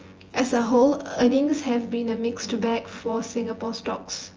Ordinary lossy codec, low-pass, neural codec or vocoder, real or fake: Opus, 24 kbps; 7.2 kHz; vocoder, 24 kHz, 100 mel bands, Vocos; fake